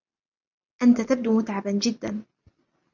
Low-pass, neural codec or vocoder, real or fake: 7.2 kHz; none; real